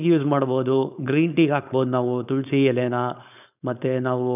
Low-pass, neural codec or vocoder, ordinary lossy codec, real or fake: 3.6 kHz; codec, 16 kHz, 4.8 kbps, FACodec; none; fake